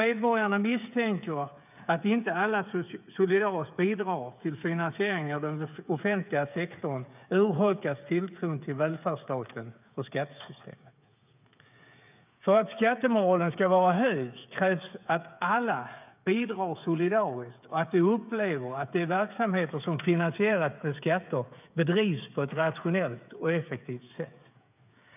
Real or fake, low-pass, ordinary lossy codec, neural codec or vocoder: fake; 3.6 kHz; none; codec, 16 kHz, 8 kbps, FreqCodec, smaller model